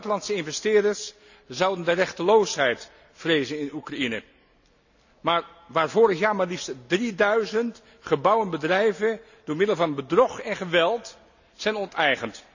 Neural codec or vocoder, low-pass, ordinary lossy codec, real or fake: none; 7.2 kHz; none; real